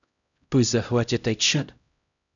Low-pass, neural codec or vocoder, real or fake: 7.2 kHz; codec, 16 kHz, 0.5 kbps, X-Codec, HuBERT features, trained on LibriSpeech; fake